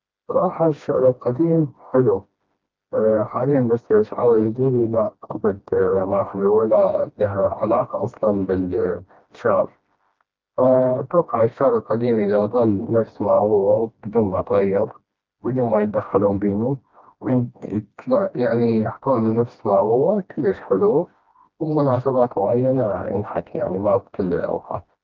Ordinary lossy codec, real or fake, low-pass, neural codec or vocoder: Opus, 24 kbps; fake; 7.2 kHz; codec, 16 kHz, 1 kbps, FreqCodec, smaller model